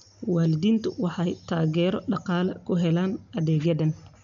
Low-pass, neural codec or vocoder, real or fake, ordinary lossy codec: 7.2 kHz; none; real; none